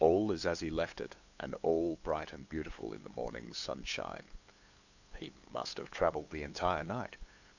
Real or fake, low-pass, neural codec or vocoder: fake; 7.2 kHz; codec, 16 kHz, 8 kbps, FunCodec, trained on LibriTTS, 25 frames a second